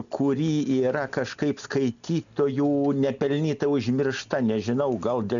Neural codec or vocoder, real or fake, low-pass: none; real; 7.2 kHz